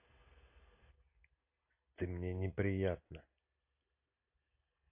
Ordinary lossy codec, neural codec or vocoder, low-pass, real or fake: AAC, 32 kbps; none; 3.6 kHz; real